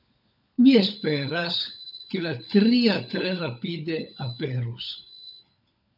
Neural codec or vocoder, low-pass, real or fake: codec, 16 kHz, 16 kbps, FunCodec, trained on LibriTTS, 50 frames a second; 5.4 kHz; fake